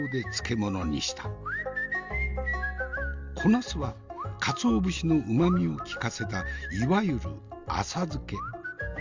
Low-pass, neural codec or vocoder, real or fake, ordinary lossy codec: 7.2 kHz; none; real; Opus, 32 kbps